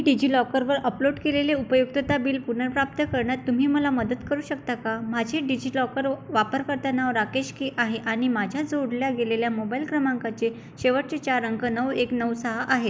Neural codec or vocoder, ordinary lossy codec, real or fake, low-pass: none; none; real; none